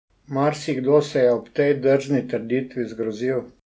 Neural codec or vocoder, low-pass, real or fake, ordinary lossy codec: none; none; real; none